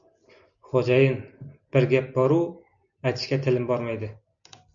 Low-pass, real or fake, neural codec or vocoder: 7.2 kHz; real; none